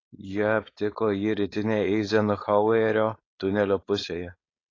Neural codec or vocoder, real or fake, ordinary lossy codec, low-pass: codec, 16 kHz, 4.8 kbps, FACodec; fake; AAC, 32 kbps; 7.2 kHz